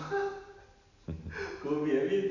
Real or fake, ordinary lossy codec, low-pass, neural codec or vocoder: real; none; 7.2 kHz; none